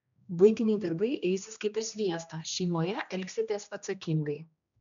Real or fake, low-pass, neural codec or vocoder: fake; 7.2 kHz; codec, 16 kHz, 1 kbps, X-Codec, HuBERT features, trained on general audio